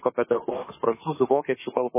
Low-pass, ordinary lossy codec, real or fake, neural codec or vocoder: 3.6 kHz; MP3, 16 kbps; fake; autoencoder, 48 kHz, 32 numbers a frame, DAC-VAE, trained on Japanese speech